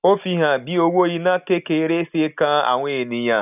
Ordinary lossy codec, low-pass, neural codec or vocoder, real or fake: none; 3.6 kHz; none; real